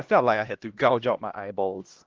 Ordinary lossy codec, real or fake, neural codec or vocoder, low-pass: Opus, 16 kbps; fake; codec, 16 kHz, 1 kbps, X-Codec, HuBERT features, trained on LibriSpeech; 7.2 kHz